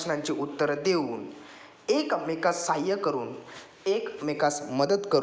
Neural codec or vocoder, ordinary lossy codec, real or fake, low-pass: none; none; real; none